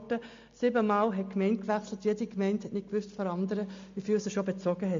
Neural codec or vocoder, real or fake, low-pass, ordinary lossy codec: none; real; 7.2 kHz; MP3, 48 kbps